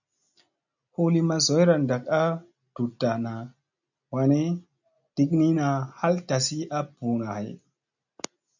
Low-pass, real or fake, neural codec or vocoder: 7.2 kHz; real; none